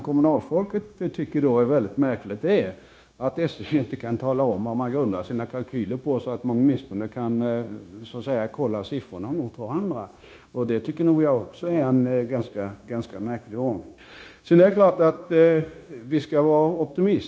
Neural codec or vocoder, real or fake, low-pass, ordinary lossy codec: codec, 16 kHz, 0.9 kbps, LongCat-Audio-Codec; fake; none; none